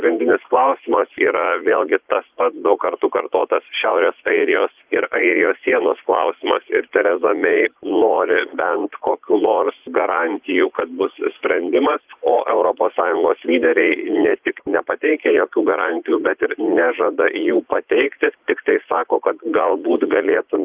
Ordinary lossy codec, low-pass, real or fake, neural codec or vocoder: Opus, 32 kbps; 3.6 kHz; fake; vocoder, 44.1 kHz, 80 mel bands, Vocos